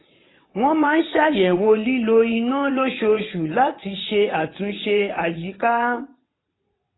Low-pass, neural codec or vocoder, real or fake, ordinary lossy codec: 7.2 kHz; vocoder, 22.05 kHz, 80 mel bands, WaveNeXt; fake; AAC, 16 kbps